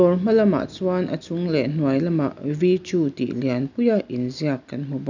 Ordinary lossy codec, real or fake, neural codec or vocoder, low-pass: none; real; none; 7.2 kHz